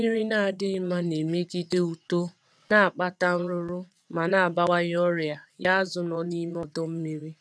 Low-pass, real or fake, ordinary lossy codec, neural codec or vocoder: none; fake; none; vocoder, 22.05 kHz, 80 mel bands, WaveNeXt